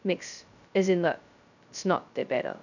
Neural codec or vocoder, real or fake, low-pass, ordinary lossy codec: codec, 16 kHz, 0.2 kbps, FocalCodec; fake; 7.2 kHz; none